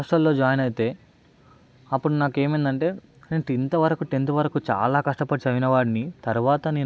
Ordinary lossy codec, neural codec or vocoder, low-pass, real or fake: none; none; none; real